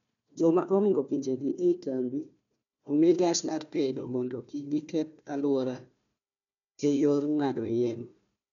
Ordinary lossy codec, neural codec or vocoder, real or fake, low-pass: none; codec, 16 kHz, 1 kbps, FunCodec, trained on Chinese and English, 50 frames a second; fake; 7.2 kHz